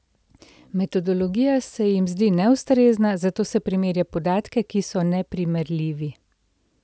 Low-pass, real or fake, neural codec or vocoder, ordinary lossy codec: none; real; none; none